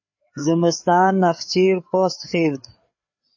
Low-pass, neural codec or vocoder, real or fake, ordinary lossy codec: 7.2 kHz; codec, 16 kHz, 4 kbps, FreqCodec, larger model; fake; MP3, 32 kbps